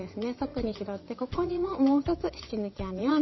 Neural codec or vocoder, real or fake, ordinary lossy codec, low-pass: vocoder, 22.05 kHz, 80 mel bands, WaveNeXt; fake; MP3, 24 kbps; 7.2 kHz